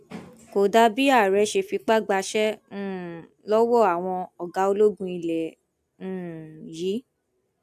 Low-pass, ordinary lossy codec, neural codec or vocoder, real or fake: 14.4 kHz; AAC, 96 kbps; none; real